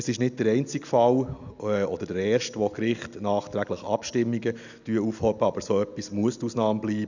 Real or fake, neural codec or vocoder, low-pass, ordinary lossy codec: real; none; 7.2 kHz; none